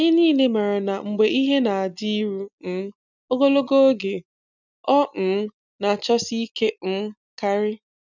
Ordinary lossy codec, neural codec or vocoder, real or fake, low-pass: none; none; real; 7.2 kHz